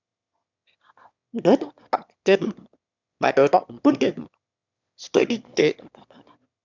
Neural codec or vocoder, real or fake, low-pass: autoencoder, 22.05 kHz, a latent of 192 numbers a frame, VITS, trained on one speaker; fake; 7.2 kHz